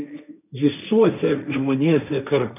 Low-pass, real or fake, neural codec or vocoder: 3.6 kHz; fake; codec, 16 kHz, 1.1 kbps, Voila-Tokenizer